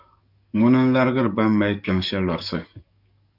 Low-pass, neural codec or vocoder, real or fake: 5.4 kHz; codec, 44.1 kHz, 7.8 kbps, DAC; fake